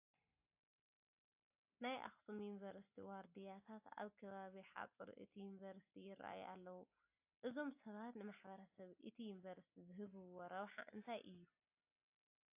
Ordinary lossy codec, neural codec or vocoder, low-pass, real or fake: AAC, 32 kbps; none; 3.6 kHz; real